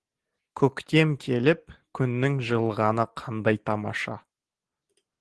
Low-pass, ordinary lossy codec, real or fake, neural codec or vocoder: 10.8 kHz; Opus, 16 kbps; real; none